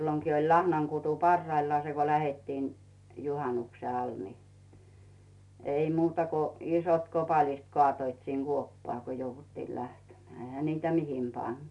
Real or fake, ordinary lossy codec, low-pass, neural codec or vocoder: real; none; 10.8 kHz; none